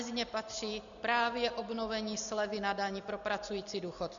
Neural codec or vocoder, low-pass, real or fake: none; 7.2 kHz; real